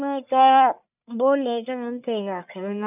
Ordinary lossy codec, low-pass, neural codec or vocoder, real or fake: none; 3.6 kHz; codec, 44.1 kHz, 1.7 kbps, Pupu-Codec; fake